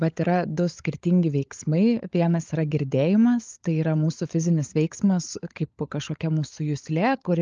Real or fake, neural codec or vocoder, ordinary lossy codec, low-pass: fake; codec, 16 kHz, 16 kbps, FunCodec, trained on LibriTTS, 50 frames a second; Opus, 24 kbps; 7.2 kHz